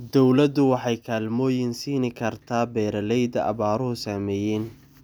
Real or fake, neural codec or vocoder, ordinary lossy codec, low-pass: real; none; none; none